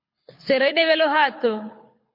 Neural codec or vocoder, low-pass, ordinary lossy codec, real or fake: codec, 24 kHz, 6 kbps, HILCodec; 5.4 kHz; MP3, 32 kbps; fake